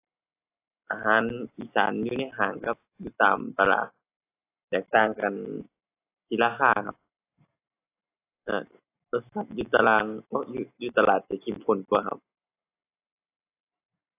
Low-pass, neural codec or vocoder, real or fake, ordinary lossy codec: 3.6 kHz; none; real; AAC, 24 kbps